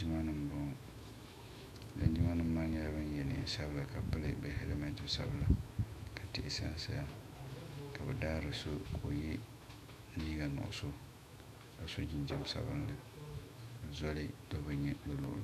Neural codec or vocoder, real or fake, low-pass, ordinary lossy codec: autoencoder, 48 kHz, 128 numbers a frame, DAC-VAE, trained on Japanese speech; fake; 14.4 kHz; MP3, 96 kbps